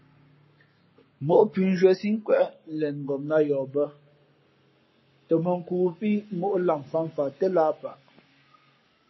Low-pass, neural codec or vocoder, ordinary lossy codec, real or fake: 7.2 kHz; codec, 44.1 kHz, 7.8 kbps, Pupu-Codec; MP3, 24 kbps; fake